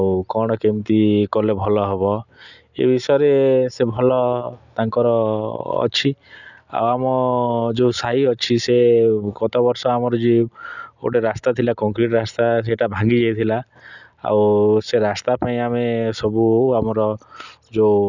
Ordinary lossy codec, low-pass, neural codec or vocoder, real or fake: none; 7.2 kHz; none; real